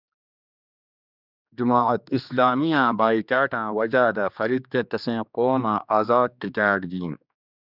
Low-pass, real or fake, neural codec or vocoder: 5.4 kHz; fake; codec, 16 kHz, 2 kbps, X-Codec, HuBERT features, trained on general audio